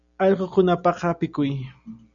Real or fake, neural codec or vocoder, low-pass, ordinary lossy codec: real; none; 7.2 kHz; MP3, 96 kbps